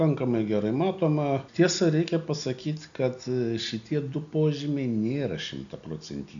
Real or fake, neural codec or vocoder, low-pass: real; none; 7.2 kHz